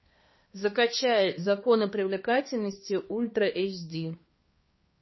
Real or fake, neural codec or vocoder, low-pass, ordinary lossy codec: fake; codec, 16 kHz, 2 kbps, X-Codec, HuBERT features, trained on balanced general audio; 7.2 kHz; MP3, 24 kbps